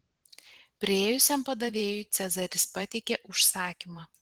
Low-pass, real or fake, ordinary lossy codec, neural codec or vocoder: 19.8 kHz; fake; Opus, 16 kbps; vocoder, 44.1 kHz, 128 mel bands every 512 samples, BigVGAN v2